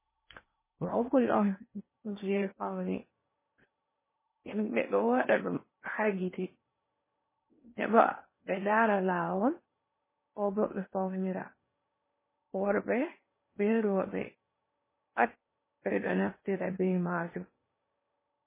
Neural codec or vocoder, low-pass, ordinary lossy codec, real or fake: codec, 16 kHz in and 24 kHz out, 0.8 kbps, FocalCodec, streaming, 65536 codes; 3.6 kHz; MP3, 16 kbps; fake